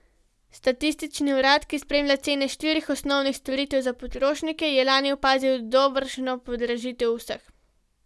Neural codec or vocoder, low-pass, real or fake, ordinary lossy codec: none; none; real; none